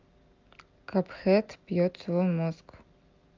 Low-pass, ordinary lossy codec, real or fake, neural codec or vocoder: 7.2 kHz; Opus, 24 kbps; real; none